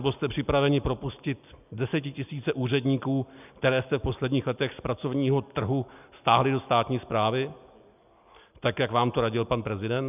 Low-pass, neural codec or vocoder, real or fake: 3.6 kHz; none; real